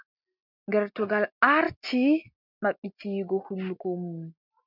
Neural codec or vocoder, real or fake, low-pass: none; real; 5.4 kHz